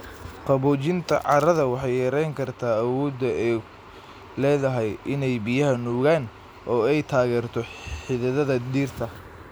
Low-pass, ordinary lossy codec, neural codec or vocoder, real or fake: none; none; none; real